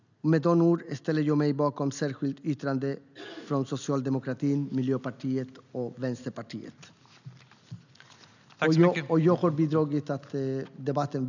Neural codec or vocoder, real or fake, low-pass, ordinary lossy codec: none; real; 7.2 kHz; none